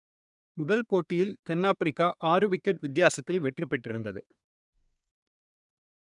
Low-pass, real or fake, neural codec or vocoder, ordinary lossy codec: 10.8 kHz; fake; codec, 24 kHz, 1 kbps, SNAC; none